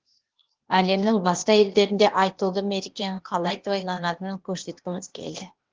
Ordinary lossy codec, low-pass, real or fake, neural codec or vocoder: Opus, 16 kbps; 7.2 kHz; fake; codec, 16 kHz, 0.8 kbps, ZipCodec